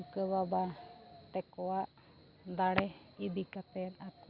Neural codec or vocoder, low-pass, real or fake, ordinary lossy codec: none; 5.4 kHz; real; Opus, 64 kbps